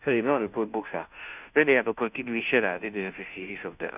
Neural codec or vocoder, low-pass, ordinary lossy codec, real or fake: codec, 16 kHz, 0.5 kbps, FunCodec, trained on Chinese and English, 25 frames a second; 3.6 kHz; none; fake